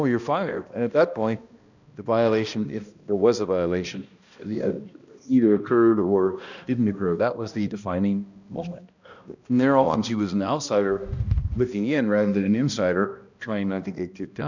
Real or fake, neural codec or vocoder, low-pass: fake; codec, 16 kHz, 1 kbps, X-Codec, HuBERT features, trained on balanced general audio; 7.2 kHz